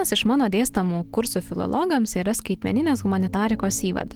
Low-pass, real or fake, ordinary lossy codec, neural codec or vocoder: 19.8 kHz; fake; Opus, 16 kbps; autoencoder, 48 kHz, 128 numbers a frame, DAC-VAE, trained on Japanese speech